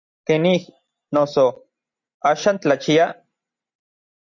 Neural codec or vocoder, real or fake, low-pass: none; real; 7.2 kHz